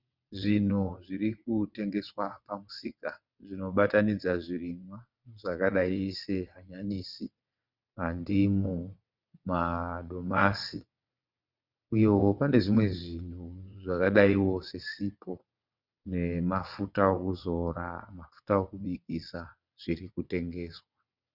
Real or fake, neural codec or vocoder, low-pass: fake; vocoder, 22.05 kHz, 80 mel bands, WaveNeXt; 5.4 kHz